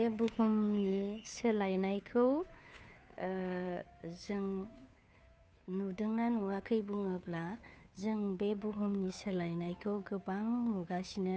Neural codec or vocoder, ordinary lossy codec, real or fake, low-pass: codec, 16 kHz, 2 kbps, FunCodec, trained on Chinese and English, 25 frames a second; none; fake; none